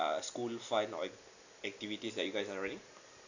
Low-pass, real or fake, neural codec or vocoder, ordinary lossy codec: 7.2 kHz; real; none; none